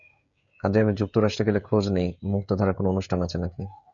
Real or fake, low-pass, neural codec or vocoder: fake; 7.2 kHz; codec, 16 kHz, 16 kbps, FreqCodec, smaller model